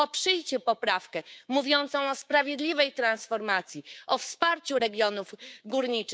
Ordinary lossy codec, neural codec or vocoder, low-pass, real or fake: none; codec, 16 kHz, 6 kbps, DAC; none; fake